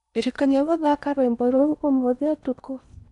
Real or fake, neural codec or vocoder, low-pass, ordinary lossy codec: fake; codec, 16 kHz in and 24 kHz out, 0.8 kbps, FocalCodec, streaming, 65536 codes; 10.8 kHz; none